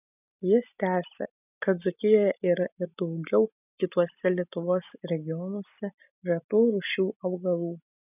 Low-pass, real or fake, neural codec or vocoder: 3.6 kHz; real; none